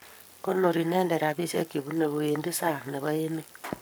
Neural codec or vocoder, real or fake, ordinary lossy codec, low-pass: codec, 44.1 kHz, 7.8 kbps, Pupu-Codec; fake; none; none